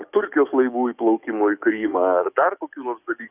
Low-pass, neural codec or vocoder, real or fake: 3.6 kHz; codec, 44.1 kHz, 7.8 kbps, DAC; fake